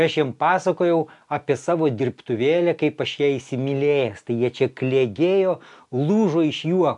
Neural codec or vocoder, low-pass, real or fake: none; 10.8 kHz; real